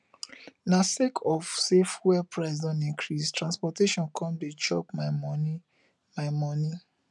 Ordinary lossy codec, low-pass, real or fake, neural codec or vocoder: none; 10.8 kHz; real; none